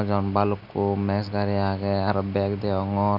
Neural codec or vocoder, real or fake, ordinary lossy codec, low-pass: none; real; none; 5.4 kHz